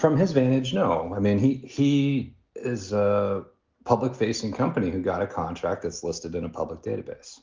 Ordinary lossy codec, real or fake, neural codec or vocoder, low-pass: Opus, 32 kbps; real; none; 7.2 kHz